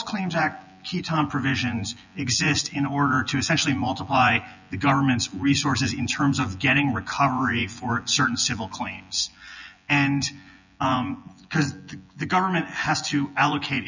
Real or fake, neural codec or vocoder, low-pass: fake; vocoder, 24 kHz, 100 mel bands, Vocos; 7.2 kHz